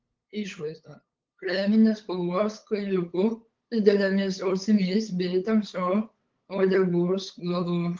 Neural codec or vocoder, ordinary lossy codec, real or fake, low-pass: codec, 16 kHz, 8 kbps, FunCodec, trained on LibriTTS, 25 frames a second; Opus, 16 kbps; fake; 7.2 kHz